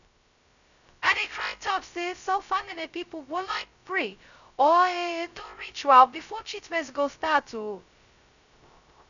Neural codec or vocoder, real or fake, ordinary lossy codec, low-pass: codec, 16 kHz, 0.2 kbps, FocalCodec; fake; none; 7.2 kHz